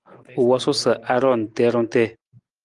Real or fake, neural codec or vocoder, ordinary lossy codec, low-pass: real; none; Opus, 32 kbps; 10.8 kHz